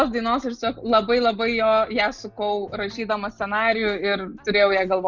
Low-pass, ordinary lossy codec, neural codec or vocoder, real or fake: 7.2 kHz; Opus, 64 kbps; none; real